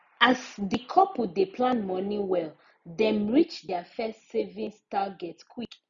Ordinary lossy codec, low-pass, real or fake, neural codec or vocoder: none; 7.2 kHz; real; none